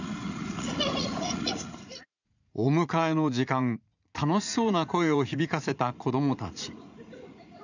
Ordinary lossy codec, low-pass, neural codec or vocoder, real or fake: none; 7.2 kHz; vocoder, 44.1 kHz, 80 mel bands, Vocos; fake